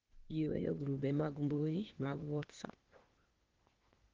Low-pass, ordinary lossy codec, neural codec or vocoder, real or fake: 7.2 kHz; Opus, 16 kbps; codec, 16 kHz, 0.8 kbps, ZipCodec; fake